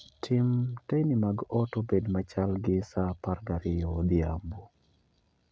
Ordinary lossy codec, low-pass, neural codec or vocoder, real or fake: none; none; none; real